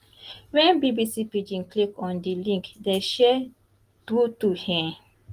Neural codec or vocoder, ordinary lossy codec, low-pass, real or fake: none; Opus, 24 kbps; 14.4 kHz; real